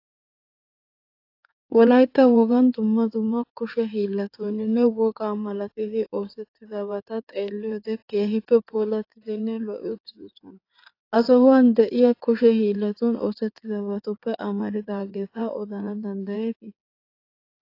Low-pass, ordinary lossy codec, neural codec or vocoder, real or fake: 5.4 kHz; AAC, 32 kbps; codec, 16 kHz in and 24 kHz out, 2.2 kbps, FireRedTTS-2 codec; fake